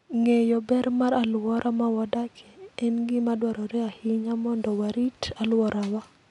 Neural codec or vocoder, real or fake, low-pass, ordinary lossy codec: none; real; 10.8 kHz; none